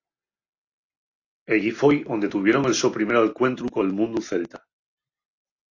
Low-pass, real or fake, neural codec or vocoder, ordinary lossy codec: 7.2 kHz; real; none; AAC, 48 kbps